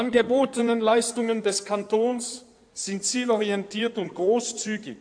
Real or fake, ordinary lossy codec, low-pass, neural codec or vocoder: fake; AAC, 64 kbps; 9.9 kHz; codec, 16 kHz in and 24 kHz out, 2.2 kbps, FireRedTTS-2 codec